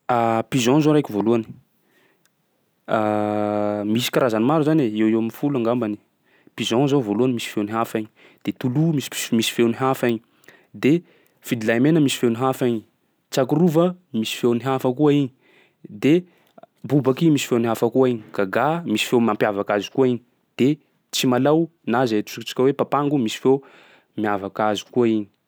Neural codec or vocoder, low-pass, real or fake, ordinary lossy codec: none; none; real; none